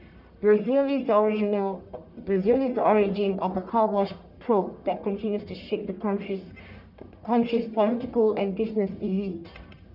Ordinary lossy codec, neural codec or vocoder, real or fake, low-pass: none; codec, 44.1 kHz, 1.7 kbps, Pupu-Codec; fake; 5.4 kHz